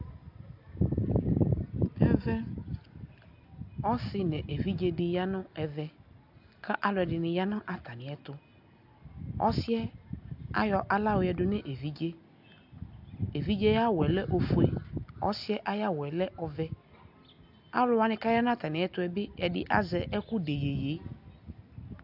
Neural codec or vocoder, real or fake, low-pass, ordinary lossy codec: none; real; 5.4 kHz; AAC, 48 kbps